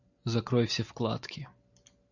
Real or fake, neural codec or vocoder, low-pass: real; none; 7.2 kHz